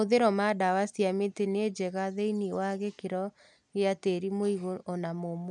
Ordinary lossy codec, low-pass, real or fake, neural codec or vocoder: none; 10.8 kHz; real; none